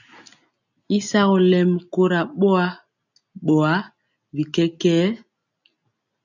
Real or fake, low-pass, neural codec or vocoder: real; 7.2 kHz; none